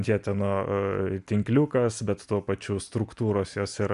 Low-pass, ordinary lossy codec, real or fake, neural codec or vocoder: 10.8 kHz; MP3, 96 kbps; real; none